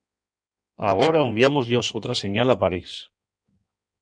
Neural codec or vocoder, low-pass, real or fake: codec, 16 kHz in and 24 kHz out, 1.1 kbps, FireRedTTS-2 codec; 9.9 kHz; fake